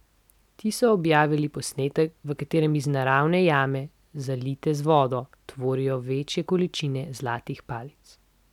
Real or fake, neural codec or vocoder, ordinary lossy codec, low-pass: real; none; none; 19.8 kHz